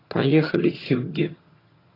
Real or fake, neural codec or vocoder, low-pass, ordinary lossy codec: fake; vocoder, 22.05 kHz, 80 mel bands, HiFi-GAN; 5.4 kHz; AAC, 24 kbps